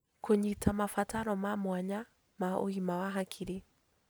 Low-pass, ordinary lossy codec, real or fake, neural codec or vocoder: none; none; real; none